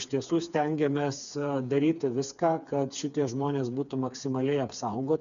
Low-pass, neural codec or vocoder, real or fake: 7.2 kHz; codec, 16 kHz, 4 kbps, FreqCodec, smaller model; fake